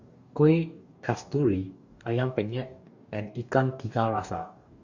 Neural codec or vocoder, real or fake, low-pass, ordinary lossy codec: codec, 44.1 kHz, 2.6 kbps, DAC; fake; 7.2 kHz; Opus, 64 kbps